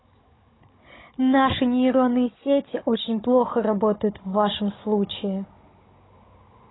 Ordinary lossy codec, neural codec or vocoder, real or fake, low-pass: AAC, 16 kbps; codec, 16 kHz, 16 kbps, FunCodec, trained on Chinese and English, 50 frames a second; fake; 7.2 kHz